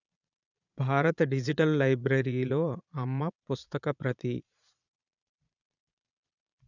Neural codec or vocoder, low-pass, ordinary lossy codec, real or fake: vocoder, 22.05 kHz, 80 mel bands, Vocos; 7.2 kHz; none; fake